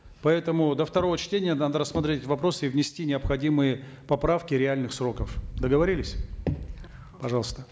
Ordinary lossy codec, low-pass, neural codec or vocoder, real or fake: none; none; none; real